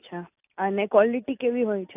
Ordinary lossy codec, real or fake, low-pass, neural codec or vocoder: AAC, 32 kbps; real; 3.6 kHz; none